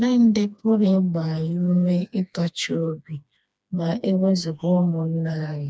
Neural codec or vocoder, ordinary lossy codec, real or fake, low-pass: codec, 16 kHz, 2 kbps, FreqCodec, smaller model; none; fake; none